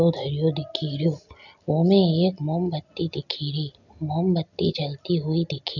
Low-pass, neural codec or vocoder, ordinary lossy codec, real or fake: 7.2 kHz; none; none; real